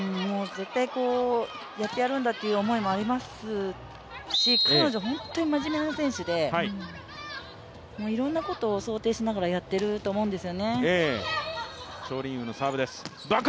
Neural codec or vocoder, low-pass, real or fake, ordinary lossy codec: none; none; real; none